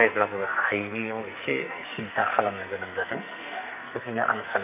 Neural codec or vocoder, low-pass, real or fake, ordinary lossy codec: codec, 44.1 kHz, 2.6 kbps, SNAC; 3.6 kHz; fake; none